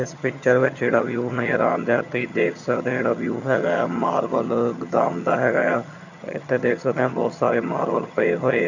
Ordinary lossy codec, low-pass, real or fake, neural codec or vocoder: none; 7.2 kHz; fake; vocoder, 22.05 kHz, 80 mel bands, HiFi-GAN